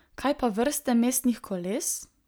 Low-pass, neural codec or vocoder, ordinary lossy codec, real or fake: none; none; none; real